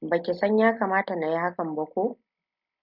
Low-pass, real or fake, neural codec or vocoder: 5.4 kHz; real; none